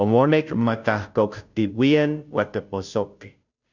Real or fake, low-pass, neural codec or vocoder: fake; 7.2 kHz; codec, 16 kHz, 0.5 kbps, FunCodec, trained on Chinese and English, 25 frames a second